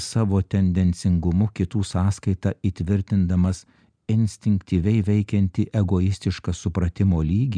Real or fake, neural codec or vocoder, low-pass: real; none; 9.9 kHz